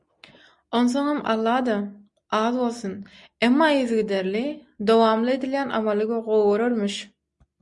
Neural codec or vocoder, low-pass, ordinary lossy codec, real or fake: none; 10.8 kHz; MP3, 96 kbps; real